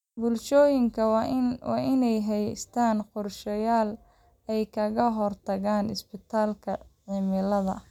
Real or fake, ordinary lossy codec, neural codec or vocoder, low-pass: real; none; none; 19.8 kHz